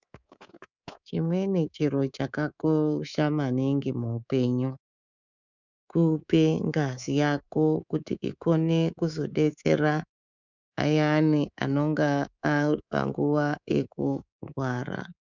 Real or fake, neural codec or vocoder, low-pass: fake; codec, 24 kHz, 3.1 kbps, DualCodec; 7.2 kHz